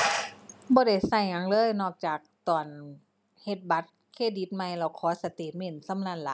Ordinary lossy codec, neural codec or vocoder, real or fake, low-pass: none; none; real; none